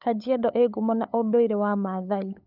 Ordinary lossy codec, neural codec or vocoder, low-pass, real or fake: none; codec, 16 kHz, 8 kbps, FunCodec, trained on LibriTTS, 25 frames a second; 5.4 kHz; fake